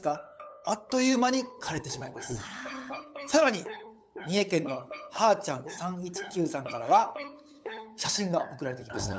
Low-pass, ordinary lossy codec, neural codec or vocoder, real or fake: none; none; codec, 16 kHz, 8 kbps, FunCodec, trained on LibriTTS, 25 frames a second; fake